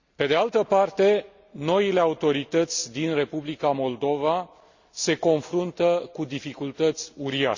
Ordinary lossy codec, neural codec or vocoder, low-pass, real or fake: Opus, 64 kbps; none; 7.2 kHz; real